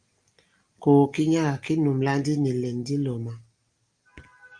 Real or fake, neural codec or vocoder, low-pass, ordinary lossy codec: real; none; 9.9 kHz; Opus, 32 kbps